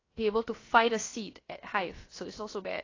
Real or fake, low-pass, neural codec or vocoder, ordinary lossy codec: fake; 7.2 kHz; codec, 16 kHz, about 1 kbps, DyCAST, with the encoder's durations; AAC, 32 kbps